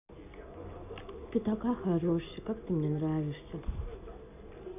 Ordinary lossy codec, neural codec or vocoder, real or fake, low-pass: none; none; real; 3.6 kHz